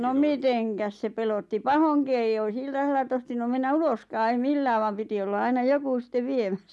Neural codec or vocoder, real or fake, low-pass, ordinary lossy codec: none; real; none; none